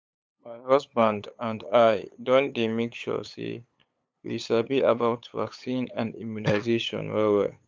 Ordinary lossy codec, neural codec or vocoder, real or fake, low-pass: none; codec, 16 kHz, 8 kbps, FunCodec, trained on LibriTTS, 25 frames a second; fake; none